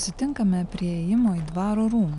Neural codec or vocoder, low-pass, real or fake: none; 10.8 kHz; real